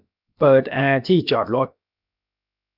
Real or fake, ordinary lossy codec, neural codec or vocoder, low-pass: fake; none; codec, 16 kHz, about 1 kbps, DyCAST, with the encoder's durations; 5.4 kHz